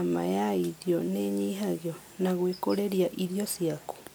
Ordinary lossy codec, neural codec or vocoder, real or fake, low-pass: none; none; real; none